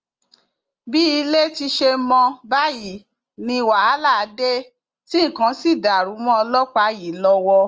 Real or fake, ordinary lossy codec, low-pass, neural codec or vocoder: real; Opus, 24 kbps; 7.2 kHz; none